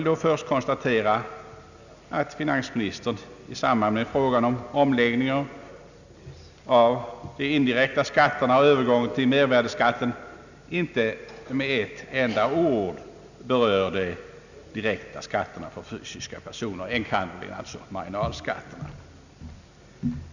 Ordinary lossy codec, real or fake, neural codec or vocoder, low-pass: none; real; none; 7.2 kHz